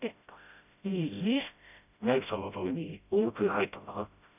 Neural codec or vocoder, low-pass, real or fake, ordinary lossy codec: codec, 16 kHz, 0.5 kbps, FreqCodec, smaller model; 3.6 kHz; fake; none